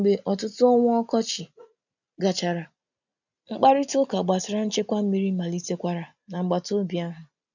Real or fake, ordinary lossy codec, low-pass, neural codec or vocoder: real; none; 7.2 kHz; none